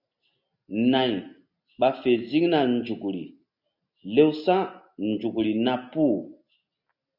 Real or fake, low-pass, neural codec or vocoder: real; 5.4 kHz; none